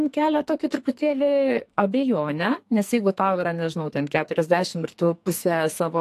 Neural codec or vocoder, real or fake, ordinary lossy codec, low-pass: codec, 44.1 kHz, 2.6 kbps, SNAC; fake; AAC, 64 kbps; 14.4 kHz